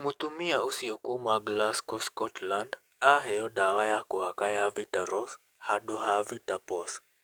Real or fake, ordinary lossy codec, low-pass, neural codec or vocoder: fake; none; none; codec, 44.1 kHz, 7.8 kbps, DAC